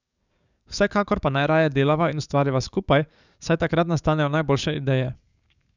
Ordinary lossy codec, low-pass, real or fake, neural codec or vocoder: none; 7.2 kHz; fake; codec, 44.1 kHz, 7.8 kbps, DAC